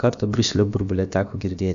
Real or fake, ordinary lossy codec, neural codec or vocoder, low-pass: fake; AAC, 96 kbps; codec, 16 kHz, about 1 kbps, DyCAST, with the encoder's durations; 7.2 kHz